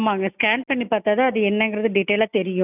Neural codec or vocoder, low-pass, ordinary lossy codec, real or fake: none; 3.6 kHz; none; real